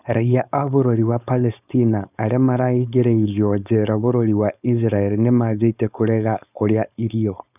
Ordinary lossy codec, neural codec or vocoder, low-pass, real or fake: none; codec, 16 kHz, 4.8 kbps, FACodec; 3.6 kHz; fake